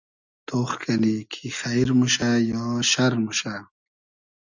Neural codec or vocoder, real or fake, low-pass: none; real; 7.2 kHz